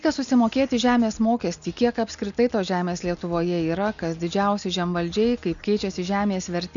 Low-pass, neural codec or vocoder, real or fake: 7.2 kHz; none; real